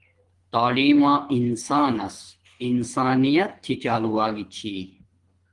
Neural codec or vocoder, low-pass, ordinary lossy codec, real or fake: codec, 24 kHz, 3 kbps, HILCodec; 10.8 kHz; Opus, 24 kbps; fake